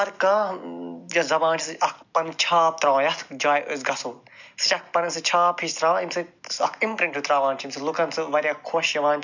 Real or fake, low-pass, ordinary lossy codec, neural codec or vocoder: real; 7.2 kHz; none; none